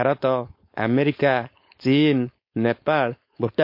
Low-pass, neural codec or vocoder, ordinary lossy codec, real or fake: 5.4 kHz; codec, 16 kHz, 4.8 kbps, FACodec; MP3, 32 kbps; fake